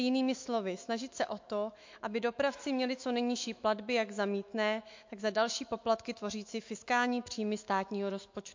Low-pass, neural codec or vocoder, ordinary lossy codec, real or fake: 7.2 kHz; autoencoder, 48 kHz, 128 numbers a frame, DAC-VAE, trained on Japanese speech; MP3, 48 kbps; fake